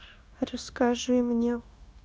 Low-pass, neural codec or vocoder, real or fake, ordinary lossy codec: none; codec, 16 kHz, 0.9 kbps, LongCat-Audio-Codec; fake; none